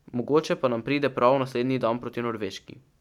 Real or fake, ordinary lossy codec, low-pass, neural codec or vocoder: fake; none; 19.8 kHz; vocoder, 44.1 kHz, 128 mel bands every 512 samples, BigVGAN v2